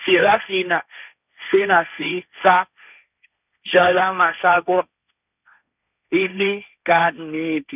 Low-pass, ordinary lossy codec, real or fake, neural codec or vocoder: 3.6 kHz; none; fake; codec, 16 kHz, 1.1 kbps, Voila-Tokenizer